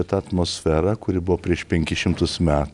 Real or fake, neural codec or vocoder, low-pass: real; none; 10.8 kHz